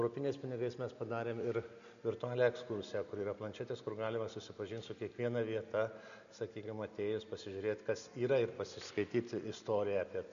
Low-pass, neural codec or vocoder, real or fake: 7.2 kHz; none; real